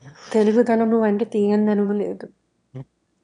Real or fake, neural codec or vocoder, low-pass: fake; autoencoder, 22.05 kHz, a latent of 192 numbers a frame, VITS, trained on one speaker; 9.9 kHz